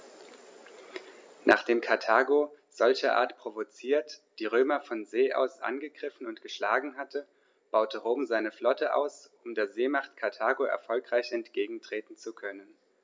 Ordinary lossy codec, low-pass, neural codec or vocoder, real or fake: none; none; none; real